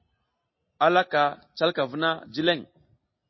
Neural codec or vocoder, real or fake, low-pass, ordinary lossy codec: none; real; 7.2 kHz; MP3, 24 kbps